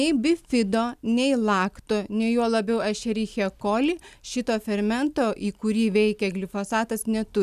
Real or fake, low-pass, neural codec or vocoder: real; 14.4 kHz; none